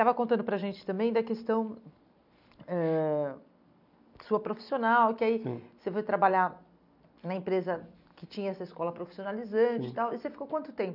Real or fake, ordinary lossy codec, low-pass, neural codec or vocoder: real; none; 5.4 kHz; none